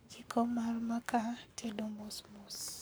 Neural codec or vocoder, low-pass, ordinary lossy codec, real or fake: codec, 44.1 kHz, 7.8 kbps, Pupu-Codec; none; none; fake